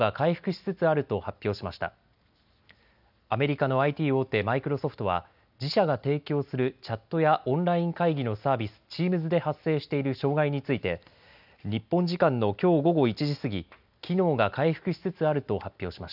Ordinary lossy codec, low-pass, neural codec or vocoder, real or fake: none; 5.4 kHz; none; real